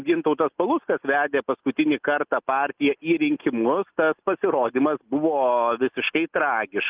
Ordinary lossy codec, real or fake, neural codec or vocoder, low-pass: Opus, 24 kbps; real; none; 3.6 kHz